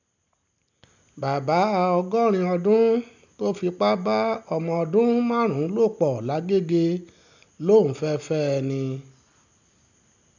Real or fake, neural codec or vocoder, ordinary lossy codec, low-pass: real; none; none; 7.2 kHz